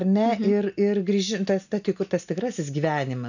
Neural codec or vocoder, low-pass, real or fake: none; 7.2 kHz; real